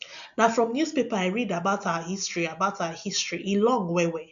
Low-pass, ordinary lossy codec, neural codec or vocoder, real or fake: 7.2 kHz; none; none; real